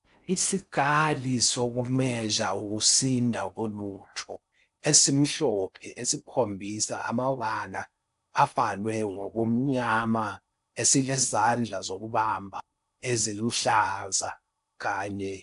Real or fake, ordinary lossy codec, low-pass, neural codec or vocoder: fake; AAC, 96 kbps; 10.8 kHz; codec, 16 kHz in and 24 kHz out, 0.6 kbps, FocalCodec, streaming, 4096 codes